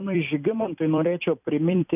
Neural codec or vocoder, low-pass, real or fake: vocoder, 44.1 kHz, 128 mel bands every 256 samples, BigVGAN v2; 3.6 kHz; fake